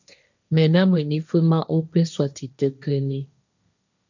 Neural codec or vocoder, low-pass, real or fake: codec, 16 kHz, 1.1 kbps, Voila-Tokenizer; 7.2 kHz; fake